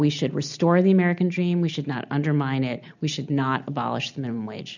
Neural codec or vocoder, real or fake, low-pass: none; real; 7.2 kHz